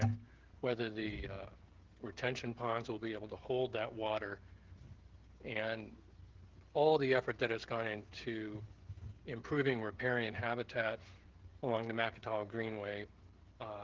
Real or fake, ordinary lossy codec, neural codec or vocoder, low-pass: fake; Opus, 16 kbps; codec, 16 kHz, 16 kbps, FreqCodec, smaller model; 7.2 kHz